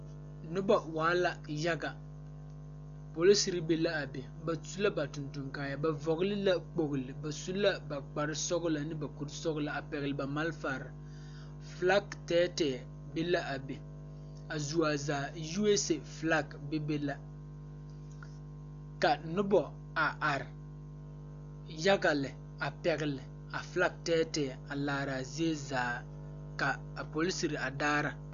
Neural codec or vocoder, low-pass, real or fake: none; 7.2 kHz; real